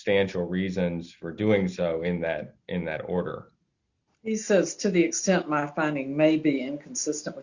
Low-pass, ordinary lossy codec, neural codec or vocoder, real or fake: 7.2 kHz; Opus, 64 kbps; none; real